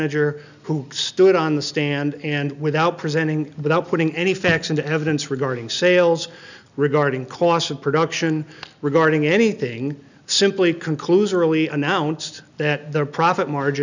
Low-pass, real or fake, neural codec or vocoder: 7.2 kHz; real; none